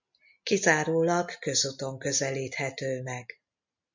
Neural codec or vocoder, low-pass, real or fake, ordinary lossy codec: none; 7.2 kHz; real; MP3, 64 kbps